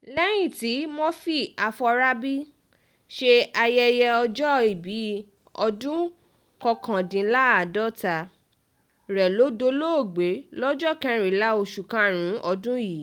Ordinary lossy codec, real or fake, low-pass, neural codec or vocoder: Opus, 32 kbps; real; 19.8 kHz; none